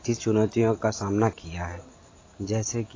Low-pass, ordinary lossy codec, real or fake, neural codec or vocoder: 7.2 kHz; AAC, 32 kbps; real; none